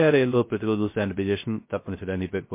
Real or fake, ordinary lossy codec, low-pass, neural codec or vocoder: fake; MP3, 24 kbps; 3.6 kHz; codec, 16 kHz, 0.3 kbps, FocalCodec